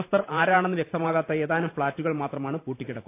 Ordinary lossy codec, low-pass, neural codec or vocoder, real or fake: AAC, 24 kbps; 3.6 kHz; vocoder, 44.1 kHz, 128 mel bands every 512 samples, BigVGAN v2; fake